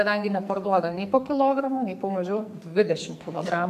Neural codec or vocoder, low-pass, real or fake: codec, 44.1 kHz, 2.6 kbps, SNAC; 14.4 kHz; fake